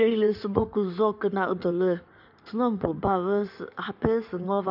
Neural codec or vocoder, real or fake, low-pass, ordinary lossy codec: codec, 16 kHz in and 24 kHz out, 2.2 kbps, FireRedTTS-2 codec; fake; 5.4 kHz; none